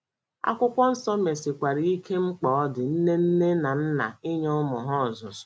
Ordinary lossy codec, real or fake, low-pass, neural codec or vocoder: none; real; none; none